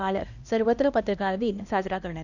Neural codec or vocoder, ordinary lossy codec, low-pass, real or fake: codec, 16 kHz, 1 kbps, X-Codec, HuBERT features, trained on LibriSpeech; none; 7.2 kHz; fake